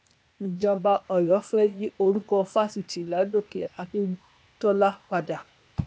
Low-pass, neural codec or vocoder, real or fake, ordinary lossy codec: none; codec, 16 kHz, 0.8 kbps, ZipCodec; fake; none